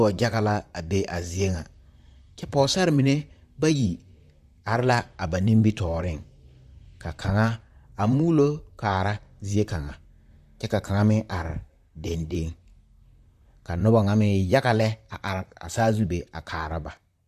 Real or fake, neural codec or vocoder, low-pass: fake; vocoder, 44.1 kHz, 128 mel bands, Pupu-Vocoder; 14.4 kHz